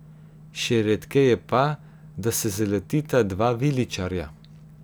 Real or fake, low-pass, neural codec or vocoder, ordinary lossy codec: real; none; none; none